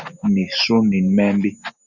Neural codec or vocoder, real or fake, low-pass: none; real; 7.2 kHz